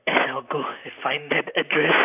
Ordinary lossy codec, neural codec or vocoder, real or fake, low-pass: none; vocoder, 44.1 kHz, 128 mel bands, Pupu-Vocoder; fake; 3.6 kHz